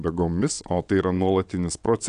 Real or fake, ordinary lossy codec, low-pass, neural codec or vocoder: fake; AAC, 96 kbps; 9.9 kHz; vocoder, 22.05 kHz, 80 mel bands, WaveNeXt